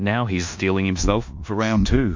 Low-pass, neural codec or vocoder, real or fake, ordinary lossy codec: 7.2 kHz; codec, 16 kHz in and 24 kHz out, 0.9 kbps, LongCat-Audio-Codec, four codebook decoder; fake; MP3, 48 kbps